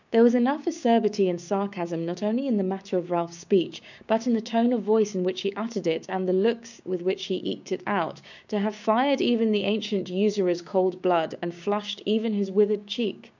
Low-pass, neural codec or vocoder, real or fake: 7.2 kHz; codec, 16 kHz, 6 kbps, DAC; fake